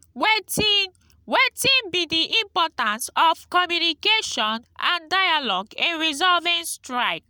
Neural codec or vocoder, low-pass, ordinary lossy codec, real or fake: none; none; none; real